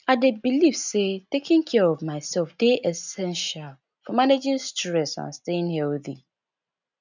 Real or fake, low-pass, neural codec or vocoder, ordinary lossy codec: real; 7.2 kHz; none; none